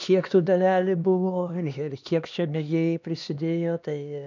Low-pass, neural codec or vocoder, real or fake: 7.2 kHz; codec, 16 kHz, 2 kbps, X-Codec, HuBERT features, trained on LibriSpeech; fake